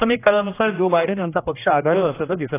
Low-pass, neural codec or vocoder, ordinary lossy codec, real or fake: 3.6 kHz; codec, 16 kHz, 1 kbps, X-Codec, HuBERT features, trained on general audio; AAC, 16 kbps; fake